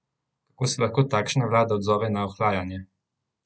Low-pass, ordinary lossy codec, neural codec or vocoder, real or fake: none; none; none; real